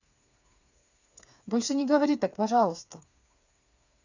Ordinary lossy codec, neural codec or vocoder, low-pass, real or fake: none; codec, 16 kHz, 4 kbps, FreqCodec, smaller model; 7.2 kHz; fake